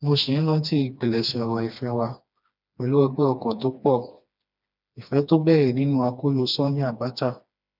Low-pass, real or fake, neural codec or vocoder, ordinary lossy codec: 5.4 kHz; fake; codec, 16 kHz, 2 kbps, FreqCodec, smaller model; none